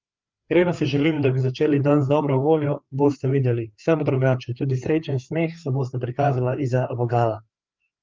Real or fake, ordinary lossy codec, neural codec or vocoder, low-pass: fake; Opus, 24 kbps; codec, 16 kHz, 4 kbps, FreqCodec, larger model; 7.2 kHz